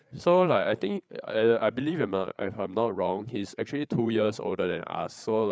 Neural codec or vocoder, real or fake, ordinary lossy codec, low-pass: codec, 16 kHz, 8 kbps, FreqCodec, larger model; fake; none; none